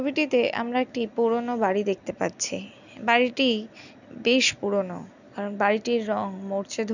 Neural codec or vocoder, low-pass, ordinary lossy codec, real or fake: none; 7.2 kHz; none; real